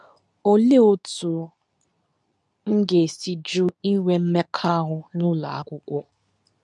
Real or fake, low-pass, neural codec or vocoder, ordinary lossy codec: fake; 10.8 kHz; codec, 24 kHz, 0.9 kbps, WavTokenizer, medium speech release version 2; none